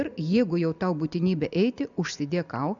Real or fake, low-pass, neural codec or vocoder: real; 7.2 kHz; none